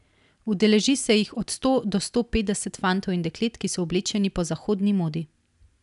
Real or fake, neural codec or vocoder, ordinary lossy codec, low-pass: real; none; none; 10.8 kHz